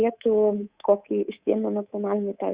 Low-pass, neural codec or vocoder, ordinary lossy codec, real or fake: 3.6 kHz; codec, 24 kHz, 3.1 kbps, DualCodec; Opus, 64 kbps; fake